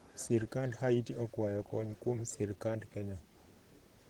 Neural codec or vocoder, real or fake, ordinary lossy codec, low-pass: vocoder, 44.1 kHz, 128 mel bands, Pupu-Vocoder; fake; Opus, 16 kbps; 19.8 kHz